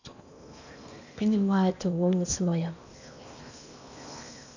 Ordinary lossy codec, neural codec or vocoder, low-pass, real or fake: none; codec, 16 kHz in and 24 kHz out, 0.8 kbps, FocalCodec, streaming, 65536 codes; 7.2 kHz; fake